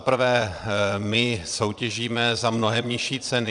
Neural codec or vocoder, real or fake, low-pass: vocoder, 22.05 kHz, 80 mel bands, Vocos; fake; 9.9 kHz